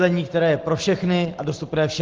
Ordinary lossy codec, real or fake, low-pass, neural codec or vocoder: Opus, 16 kbps; real; 7.2 kHz; none